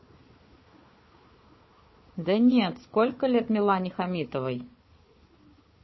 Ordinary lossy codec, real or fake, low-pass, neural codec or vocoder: MP3, 24 kbps; fake; 7.2 kHz; codec, 16 kHz, 4 kbps, FunCodec, trained on Chinese and English, 50 frames a second